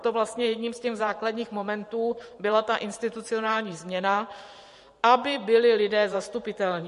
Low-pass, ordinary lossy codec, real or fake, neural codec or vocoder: 14.4 kHz; MP3, 48 kbps; fake; vocoder, 44.1 kHz, 128 mel bands every 512 samples, BigVGAN v2